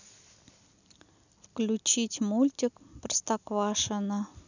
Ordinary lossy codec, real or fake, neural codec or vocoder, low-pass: none; real; none; 7.2 kHz